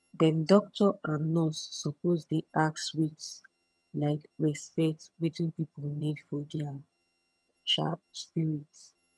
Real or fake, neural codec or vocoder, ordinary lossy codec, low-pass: fake; vocoder, 22.05 kHz, 80 mel bands, HiFi-GAN; none; none